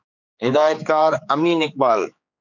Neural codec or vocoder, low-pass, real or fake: codec, 16 kHz, 4 kbps, X-Codec, HuBERT features, trained on general audio; 7.2 kHz; fake